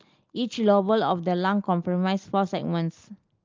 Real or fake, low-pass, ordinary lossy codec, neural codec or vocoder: real; 7.2 kHz; Opus, 32 kbps; none